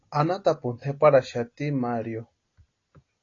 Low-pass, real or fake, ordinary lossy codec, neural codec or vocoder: 7.2 kHz; real; AAC, 32 kbps; none